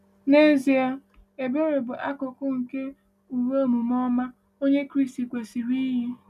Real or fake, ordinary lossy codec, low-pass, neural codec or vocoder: real; none; 14.4 kHz; none